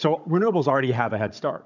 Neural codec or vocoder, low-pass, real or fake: codec, 16 kHz, 16 kbps, FunCodec, trained on Chinese and English, 50 frames a second; 7.2 kHz; fake